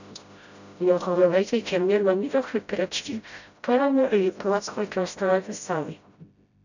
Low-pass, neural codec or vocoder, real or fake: 7.2 kHz; codec, 16 kHz, 0.5 kbps, FreqCodec, smaller model; fake